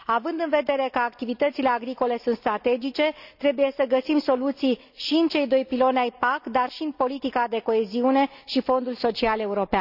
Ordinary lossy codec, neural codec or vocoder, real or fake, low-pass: none; none; real; 5.4 kHz